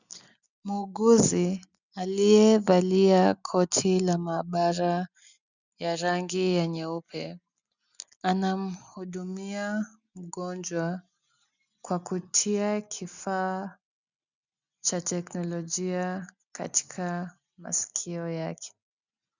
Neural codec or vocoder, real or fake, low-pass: none; real; 7.2 kHz